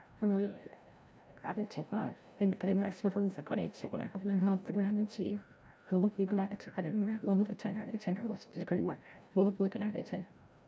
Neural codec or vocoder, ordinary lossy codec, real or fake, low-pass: codec, 16 kHz, 0.5 kbps, FreqCodec, larger model; none; fake; none